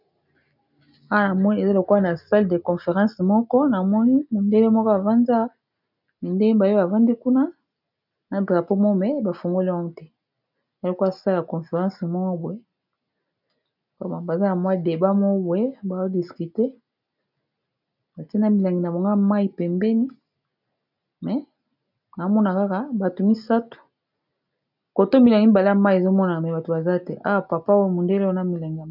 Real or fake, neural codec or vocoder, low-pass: real; none; 5.4 kHz